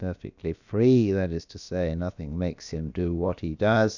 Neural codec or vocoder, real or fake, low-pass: codec, 16 kHz, about 1 kbps, DyCAST, with the encoder's durations; fake; 7.2 kHz